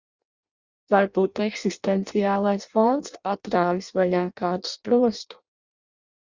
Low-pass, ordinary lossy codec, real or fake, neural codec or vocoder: 7.2 kHz; Opus, 64 kbps; fake; codec, 16 kHz in and 24 kHz out, 0.6 kbps, FireRedTTS-2 codec